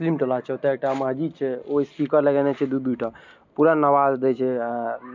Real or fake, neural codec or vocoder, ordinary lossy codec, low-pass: real; none; MP3, 64 kbps; 7.2 kHz